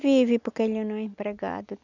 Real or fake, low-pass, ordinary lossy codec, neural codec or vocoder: real; 7.2 kHz; none; none